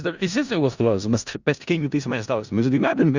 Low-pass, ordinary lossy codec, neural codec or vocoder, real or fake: 7.2 kHz; Opus, 64 kbps; codec, 16 kHz in and 24 kHz out, 0.4 kbps, LongCat-Audio-Codec, four codebook decoder; fake